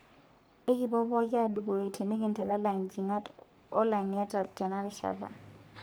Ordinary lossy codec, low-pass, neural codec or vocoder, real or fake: none; none; codec, 44.1 kHz, 3.4 kbps, Pupu-Codec; fake